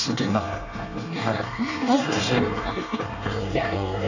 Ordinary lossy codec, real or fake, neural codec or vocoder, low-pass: none; fake; codec, 24 kHz, 1 kbps, SNAC; 7.2 kHz